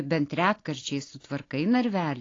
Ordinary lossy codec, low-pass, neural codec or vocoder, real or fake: AAC, 32 kbps; 7.2 kHz; none; real